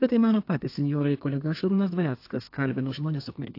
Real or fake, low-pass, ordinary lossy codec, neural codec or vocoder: fake; 5.4 kHz; AAC, 32 kbps; codec, 32 kHz, 1.9 kbps, SNAC